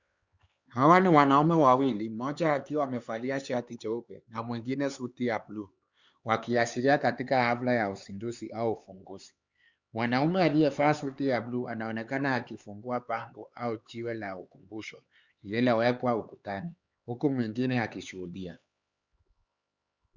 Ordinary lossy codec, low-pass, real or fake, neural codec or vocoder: Opus, 64 kbps; 7.2 kHz; fake; codec, 16 kHz, 4 kbps, X-Codec, HuBERT features, trained on LibriSpeech